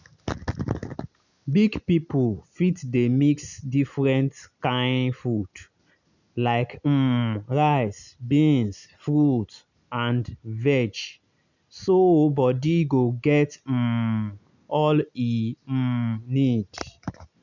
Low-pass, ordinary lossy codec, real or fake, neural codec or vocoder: 7.2 kHz; none; real; none